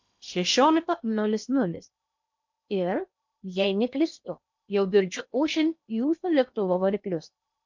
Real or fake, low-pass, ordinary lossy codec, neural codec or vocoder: fake; 7.2 kHz; MP3, 64 kbps; codec, 16 kHz in and 24 kHz out, 0.8 kbps, FocalCodec, streaming, 65536 codes